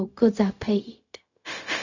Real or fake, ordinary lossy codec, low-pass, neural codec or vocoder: fake; none; 7.2 kHz; codec, 16 kHz, 0.4 kbps, LongCat-Audio-Codec